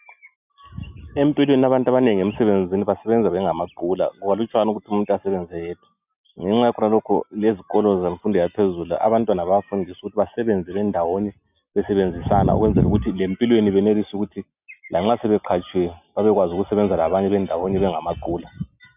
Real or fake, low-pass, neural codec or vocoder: real; 3.6 kHz; none